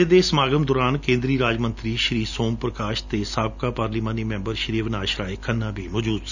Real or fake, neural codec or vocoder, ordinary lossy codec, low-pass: real; none; none; 7.2 kHz